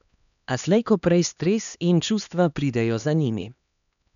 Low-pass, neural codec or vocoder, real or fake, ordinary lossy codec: 7.2 kHz; codec, 16 kHz, 2 kbps, X-Codec, HuBERT features, trained on LibriSpeech; fake; none